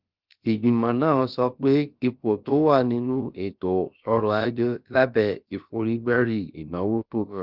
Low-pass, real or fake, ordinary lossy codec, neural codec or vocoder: 5.4 kHz; fake; Opus, 32 kbps; codec, 16 kHz, about 1 kbps, DyCAST, with the encoder's durations